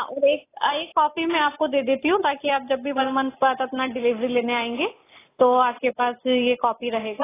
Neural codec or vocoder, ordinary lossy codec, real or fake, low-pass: none; AAC, 16 kbps; real; 3.6 kHz